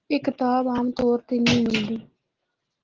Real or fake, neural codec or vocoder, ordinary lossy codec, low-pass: real; none; Opus, 16 kbps; 7.2 kHz